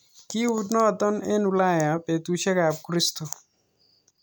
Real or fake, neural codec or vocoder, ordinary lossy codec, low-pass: real; none; none; none